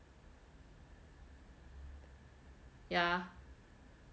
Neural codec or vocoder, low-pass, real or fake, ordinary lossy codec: none; none; real; none